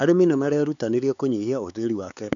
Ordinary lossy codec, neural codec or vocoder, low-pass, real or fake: none; codec, 16 kHz, 4 kbps, X-Codec, HuBERT features, trained on LibriSpeech; 7.2 kHz; fake